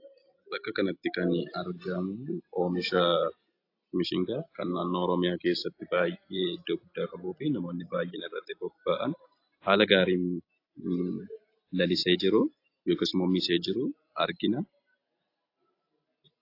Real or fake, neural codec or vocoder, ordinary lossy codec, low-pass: real; none; AAC, 32 kbps; 5.4 kHz